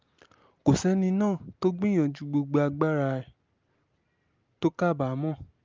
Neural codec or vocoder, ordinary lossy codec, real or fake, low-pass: none; Opus, 24 kbps; real; 7.2 kHz